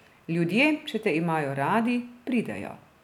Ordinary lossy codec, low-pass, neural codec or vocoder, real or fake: none; 19.8 kHz; none; real